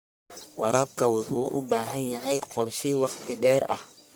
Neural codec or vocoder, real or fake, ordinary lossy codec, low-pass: codec, 44.1 kHz, 1.7 kbps, Pupu-Codec; fake; none; none